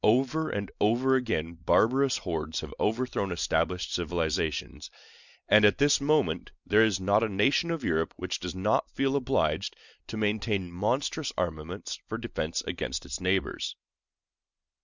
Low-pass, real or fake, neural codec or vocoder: 7.2 kHz; real; none